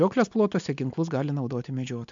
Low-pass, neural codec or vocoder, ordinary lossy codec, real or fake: 7.2 kHz; none; MP3, 64 kbps; real